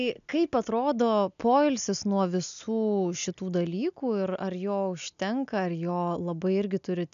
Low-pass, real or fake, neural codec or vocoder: 7.2 kHz; real; none